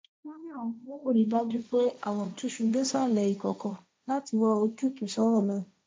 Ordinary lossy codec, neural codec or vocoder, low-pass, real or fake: none; codec, 16 kHz, 1.1 kbps, Voila-Tokenizer; none; fake